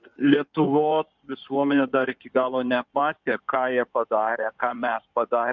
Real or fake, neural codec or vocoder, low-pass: fake; codec, 16 kHz in and 24 kHz out, 2.2 kbps, FireRedTTS-2 codec; 7.2 kHz